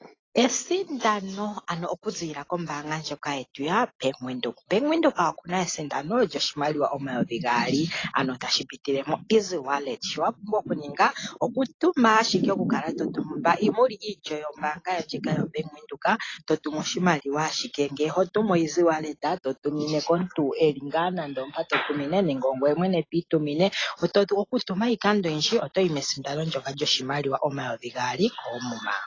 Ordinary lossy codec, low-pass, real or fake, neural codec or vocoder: AAC, 32 kbps; 7.2 kHz; real; none